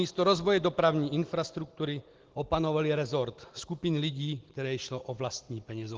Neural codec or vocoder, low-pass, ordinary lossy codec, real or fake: none; 7.2 kHz; Opus, 16 kbps; real